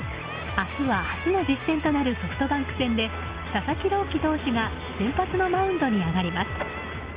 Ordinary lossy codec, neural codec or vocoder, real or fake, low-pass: Opus, 32 kbps; none; real; 3.6 kHz